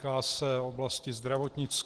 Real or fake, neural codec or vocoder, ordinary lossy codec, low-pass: real; none; Opus, 16 kbps; 10.8 kHz